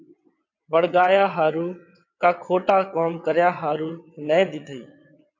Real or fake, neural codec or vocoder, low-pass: fake; vocoder, 22.05 kHz, 80 mel bands, WaveNeXt; 7.2 kHz